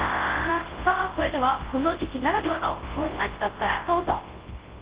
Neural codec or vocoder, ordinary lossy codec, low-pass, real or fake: codec, 24 kHz, 0.9 kbps, WavTokenizer, large speech release; Opus, 16 kbps; 3.6 kHz; fake